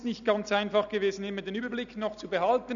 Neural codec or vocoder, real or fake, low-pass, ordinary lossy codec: none; real; 7.2 kHz; none